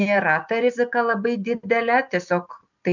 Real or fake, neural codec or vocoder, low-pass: real; none; 7.2 kHz